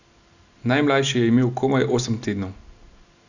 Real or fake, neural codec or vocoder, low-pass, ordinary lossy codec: real; none; 7.2 kHz; none